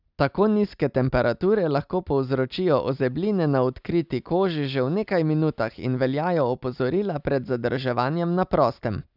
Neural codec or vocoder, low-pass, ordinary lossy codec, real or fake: none; 5.4 kHz; none; real